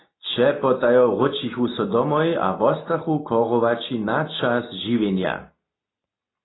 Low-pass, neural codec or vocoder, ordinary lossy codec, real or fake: 7.2 kHz; none; AAC, 16 kbps; real